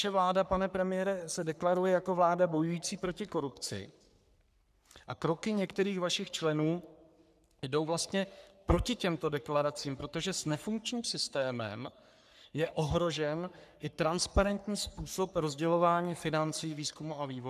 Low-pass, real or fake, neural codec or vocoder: 14.4 kHz; fake; codec, 44.1 kHz, 3.4 kbps, Pupu-Codec